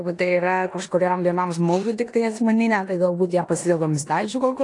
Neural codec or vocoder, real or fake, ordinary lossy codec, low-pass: codec, 16 kHz in and 24 kHz out, 0.9 kbps, LongCat-Audio-Codec, four codebook decoder; fake; AAC, 48 kbps; 10.8 kHz